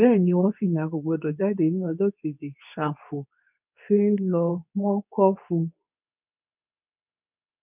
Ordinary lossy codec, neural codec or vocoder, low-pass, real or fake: none; codec, 24 kHz, 0.9 kbps, WavTokenizer, medium speech release version 2; 3.6 kHz; fake